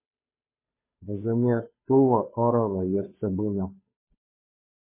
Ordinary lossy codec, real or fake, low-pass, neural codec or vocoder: MP3, 16 kbps; fake; 3.6 kHz; codec, 16 kHz, 2 kbps, FunCodec, trained on Chinese and English, 25 frames a second